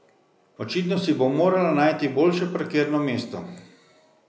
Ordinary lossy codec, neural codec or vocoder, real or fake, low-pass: none; none; real; none